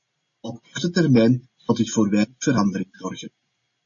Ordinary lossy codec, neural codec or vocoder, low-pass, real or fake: MP3, 32 kbps; none; 7.2 kHz; real